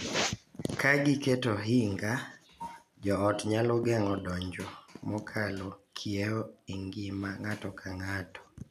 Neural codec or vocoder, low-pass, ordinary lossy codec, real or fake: none; 14.4 kHz; none; real